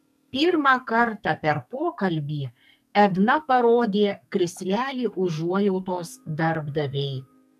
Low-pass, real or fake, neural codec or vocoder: 14.4 kHz; fake; codec, 44.1 kHz, 2.6 kbps, SNAC